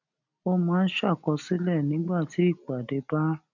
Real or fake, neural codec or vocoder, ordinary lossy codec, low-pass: real; none; none; 7.2 kHz